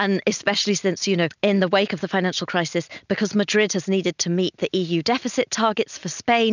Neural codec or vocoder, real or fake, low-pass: none; real; 7.2 kHz